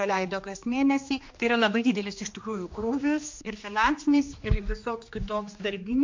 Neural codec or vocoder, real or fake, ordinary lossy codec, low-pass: codec, 16 kHz, 2 kbps, X-Codec, HuBERT features, trained on general audio; fake; MP3, 48 kbps; 7.2 kHz